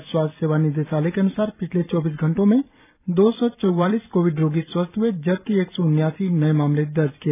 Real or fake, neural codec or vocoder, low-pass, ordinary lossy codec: fake; vocoder, 44.1 kHz, 128 mel bands every 256 samples, BigVGAN v2; 3.6 kHz; none